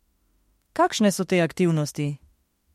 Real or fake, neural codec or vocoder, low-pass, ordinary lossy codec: fake; autoencoder, 48 kHz, 32 numbers a frame, DAC-VAE, trained on Japanese speech; 19.8 kHz; MP3, 64 kbps